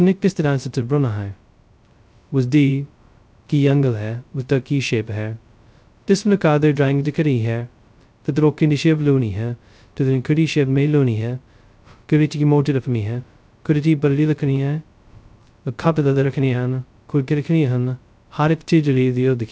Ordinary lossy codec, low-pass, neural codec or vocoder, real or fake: none; none; codec, 16 kHz, 0.2 kbps, FocalCodec; fake